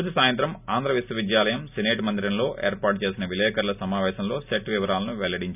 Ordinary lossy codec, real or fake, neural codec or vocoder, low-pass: none; real; none; 3.6 kHz